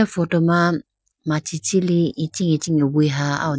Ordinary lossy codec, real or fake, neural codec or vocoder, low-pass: none; real; none; none